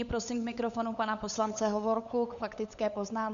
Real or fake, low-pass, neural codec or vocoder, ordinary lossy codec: fake; 7.2 kHz; codec, 16 kHz, 8 kbps, FunCodec, trained on LibriTTS, 25 frames a second; AAC, 64 kbps